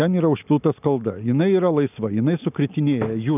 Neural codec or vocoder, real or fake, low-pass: none; real; 3.6 kHz